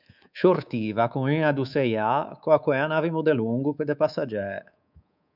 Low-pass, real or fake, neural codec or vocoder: 5.4 kHz; fake; codec, 24 kHz, 3.1 kbps, DualCodec